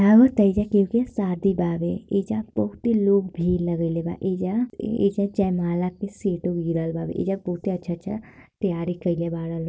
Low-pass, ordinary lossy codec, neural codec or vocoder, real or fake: none; none; none; real